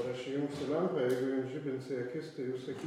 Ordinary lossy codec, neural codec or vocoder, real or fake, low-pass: MP3, 64 kbps; none; real; 19.8 kHz